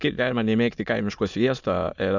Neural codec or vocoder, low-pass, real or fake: autoencoder, 22.05 kHz, a latent of 192 numbers a frame, VITS, trained on many speakers; 7.2 kHz; fake